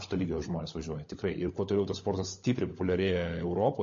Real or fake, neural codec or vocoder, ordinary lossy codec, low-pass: fake; codec, 16 kHz, 16 kbps, FunCodec, trained on LibriTTS, 50 frames a second; MP3, 32 kbps; 7.2 kHz